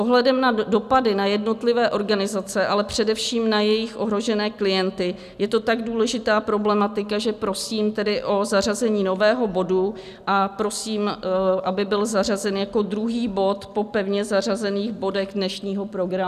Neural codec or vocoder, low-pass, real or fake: none; 14.4 kHz; real